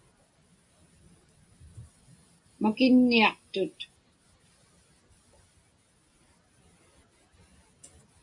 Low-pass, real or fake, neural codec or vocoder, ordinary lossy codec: 10.8 kHz; real; none; MP3, 64 kbps